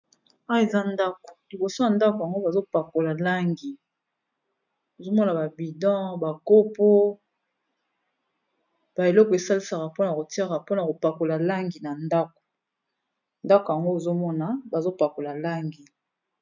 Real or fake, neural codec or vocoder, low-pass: real; none; 7.2 kHz